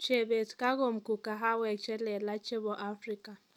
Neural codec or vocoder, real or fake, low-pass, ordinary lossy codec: none; real; 19.8 kHz; none